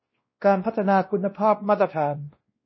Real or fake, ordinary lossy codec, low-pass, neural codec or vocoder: fake; MP3, 24 kbps; 7.2 kHz; codec, 16 kHz, 1 kbps, X-Codec, WavLM features, trained on Multilingual LibriSpeech